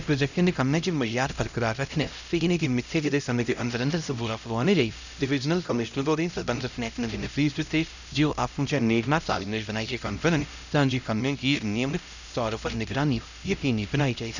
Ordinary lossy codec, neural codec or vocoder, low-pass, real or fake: none; codec, 16 kHz, 0.5 kbps, X-Codec, HuBERT features, trained on LibriSpeech; 7.2 kHz; fake